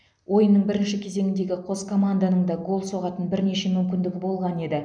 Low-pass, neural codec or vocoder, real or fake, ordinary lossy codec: 9.9 kHz; none; real; none